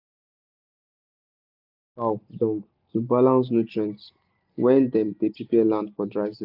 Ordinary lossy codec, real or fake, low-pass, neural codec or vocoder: AAC, 48 kbps; real; 5.4 kHz; none